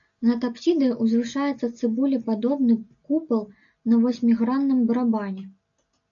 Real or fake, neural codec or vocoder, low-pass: real; none; 7.2 kHz